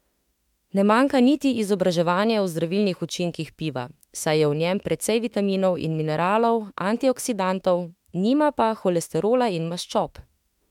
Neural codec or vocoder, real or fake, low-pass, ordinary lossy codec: autoencoder, 48 kHz, 32 numbers a frame, DAC-VAE, trained on Japanese speech; fake; 19.8 kHz; MP3, 96 kbps